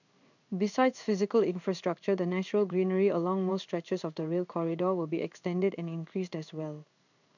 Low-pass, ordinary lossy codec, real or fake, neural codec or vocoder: 7.2 kHz; none; fake; codec, 16 kHz in and 24 kHz out, 1 kbps, XY-Tokenizer